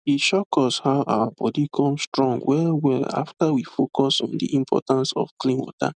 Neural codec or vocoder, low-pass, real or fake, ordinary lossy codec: none; 9.9 kHz; real; none